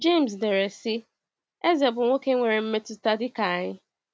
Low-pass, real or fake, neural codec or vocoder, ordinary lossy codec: none; real; none; none